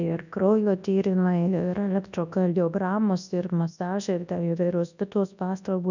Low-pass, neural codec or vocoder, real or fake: 7.2 kHz; codec, 24 kHz, 0.9 kbps, WavTokenizer, large speech release; fake